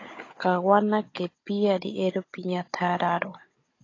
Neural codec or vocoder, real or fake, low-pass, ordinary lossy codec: codec, 16 kHz, 16 kbps, FunCodec, trained on Chinese and English, 50 frames a second; fake; 7.2 kHz; AAC, 32 kbps